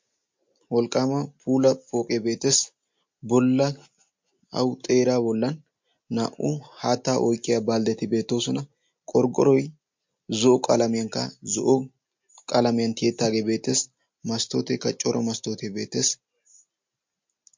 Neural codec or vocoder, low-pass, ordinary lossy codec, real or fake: none; 7.2 kHz; MP3, 48 kbps; real